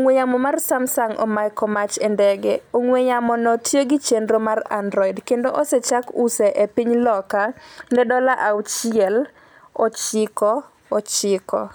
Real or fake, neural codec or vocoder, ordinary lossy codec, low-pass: real; none; none; none